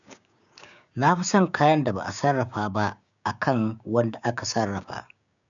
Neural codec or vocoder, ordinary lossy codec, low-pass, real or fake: codec, 16 kHz, 6 kbps, DAC; none; 7.2 kHz; fake